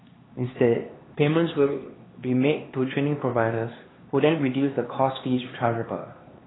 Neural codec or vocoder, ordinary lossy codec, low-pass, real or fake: codec, 16 kHz, 2 kbps, X-Codec, HuBERT features, trained on LibriSpeech; AAC, 16 kbps; 7.2 kHz; fake